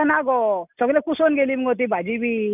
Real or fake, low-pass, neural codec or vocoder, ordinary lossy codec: real; 3.6 kHz; none; none